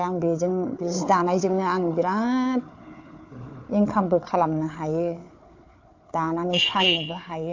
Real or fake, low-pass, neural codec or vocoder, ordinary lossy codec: fake; 7.2 kHz; codec, 16 kHz, 4 kbps, FunCodec, trained on Chinese and English, 50 frames a second; AAC, 48 kbps